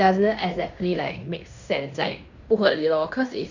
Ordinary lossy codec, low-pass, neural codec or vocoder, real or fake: none; 7.2 kHz; codec, 16 kHz in and 24 kHz out, 0.9 kbps, LongCat-Audio-Codec, fine tuned four codebook decoder; fake